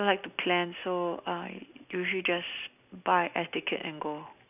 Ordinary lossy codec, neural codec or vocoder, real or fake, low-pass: none; none; real; 3.6 kHz